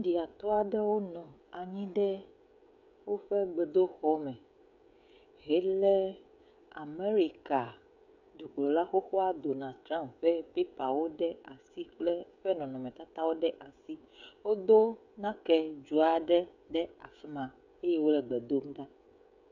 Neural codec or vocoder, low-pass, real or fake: codec, 16 kHz, 16 kbps, FreqCodec, smaller model; 7.2 kHz; fake